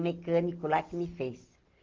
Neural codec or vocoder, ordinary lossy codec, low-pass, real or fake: none; Opus, 16 kbps; 7.2 kHz; real